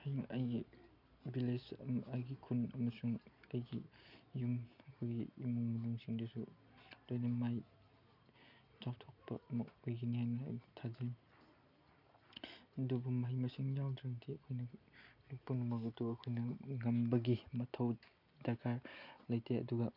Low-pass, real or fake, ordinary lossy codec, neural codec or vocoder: 5.4 kHz; real; none; none